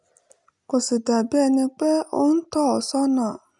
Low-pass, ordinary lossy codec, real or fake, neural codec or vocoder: 10.8 kHz; MP3, 96 kbps; real; none